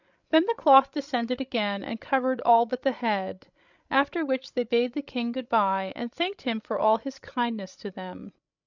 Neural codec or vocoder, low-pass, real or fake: codec, 16 kHz, 16 kbps, FreqCodec, larger model; 7.2 kHz; fake